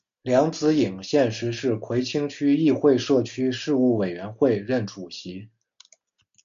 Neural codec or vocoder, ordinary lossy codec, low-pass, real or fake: none; MP3, 64 kbps; 7.2 kHz; real